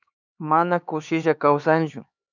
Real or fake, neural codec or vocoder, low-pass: fake; codec, 16 kHz, 4 kbps, X-Codec, HuBERT features, trained on LibriSpeech; 7.2 kHz